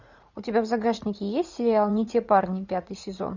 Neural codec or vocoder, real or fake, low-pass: vocoder, 44.1 kHz, 80 mel bands, Vocos; fake; 7.2 kHz